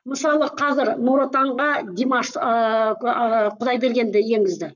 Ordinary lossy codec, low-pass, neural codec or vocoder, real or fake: none; 7.2 kHz; codec, 44.1 kHz, 7.8 kbps, Pupu-Codec; fake